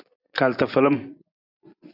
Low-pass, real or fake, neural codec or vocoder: 5.4 kHz; fake; vocoder, 24 kHz, 100 mel bands, Vocos